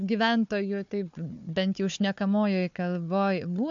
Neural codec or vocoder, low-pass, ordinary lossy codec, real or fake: codec, 16 kHz, 4 kbps, FunCodec, trained on Chinese and English, 50 frames a second; 7.2 kHz; MP3, 64 kbps; fake